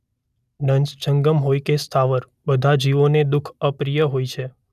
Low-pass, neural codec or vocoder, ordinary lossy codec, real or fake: 14.4 kHz; none; none; real